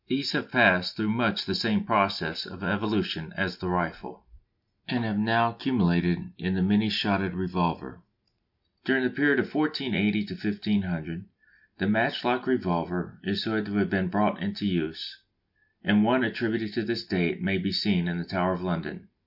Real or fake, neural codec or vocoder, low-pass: real; none; 5.4 kHz